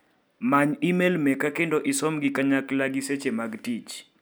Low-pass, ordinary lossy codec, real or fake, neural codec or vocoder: none; none; real; none